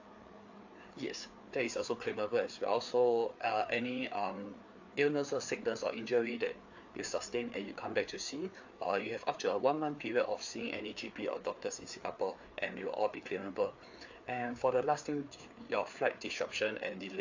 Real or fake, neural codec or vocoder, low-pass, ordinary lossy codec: fake; codec, 16 kHz, 4 kbps, FreqCodec, larger model; 7.2 kHz; AAC, 48 kbps